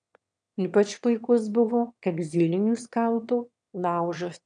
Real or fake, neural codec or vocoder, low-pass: fake; autoencoder, 22.05 kHz, a latent of 192 numbers a frame, VITS, trained on one speaker; 9.9 kHz